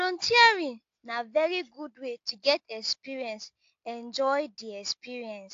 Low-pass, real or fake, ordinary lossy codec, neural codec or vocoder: 7.2 kHz; real; AAC, 48 kbps; none